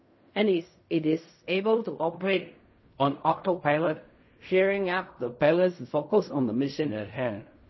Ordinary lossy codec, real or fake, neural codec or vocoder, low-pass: MP3, 24 kbps; fake; codec, 16 kHz in and 24 kHz out, 0.4 kbps, LongCat-Audio-Codec, fine tuned four codebook decoder; 7.2 kHz